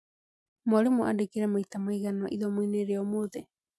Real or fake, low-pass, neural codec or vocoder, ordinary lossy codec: real; none; none; none